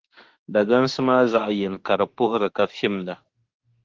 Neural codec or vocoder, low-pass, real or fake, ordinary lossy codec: autoencoder, 48 kHz, 32 numbers a frame, DAC-VAE, trained on Japanese speech; 7.2 kHz; fake; Opus, 16 kbps